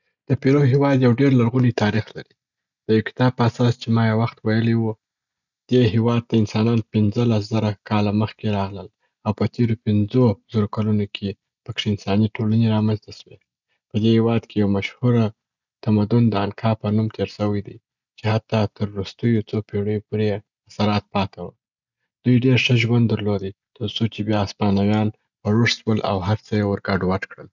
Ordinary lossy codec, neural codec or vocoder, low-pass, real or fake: none; none; 7.2 kHz; real